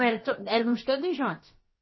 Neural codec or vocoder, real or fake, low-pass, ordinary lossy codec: codec, 16 kHz, about 1 kbps, DyCAST, with the encoder's durations; fake; 7.2 kHz; MP3, 24 kbps